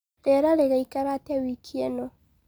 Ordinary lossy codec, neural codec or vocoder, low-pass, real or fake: none; none; none; real